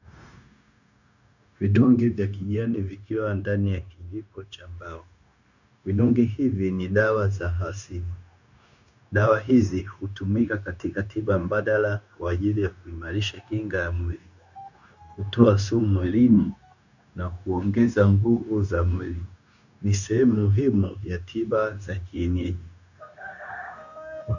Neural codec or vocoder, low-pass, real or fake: codec, 16 kHz, 0.9 kbps, LongCat-Audio-Codec; 7.2 kHz; fake